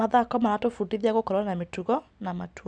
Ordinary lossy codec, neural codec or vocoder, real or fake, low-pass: none; none; real; 9.9 kHz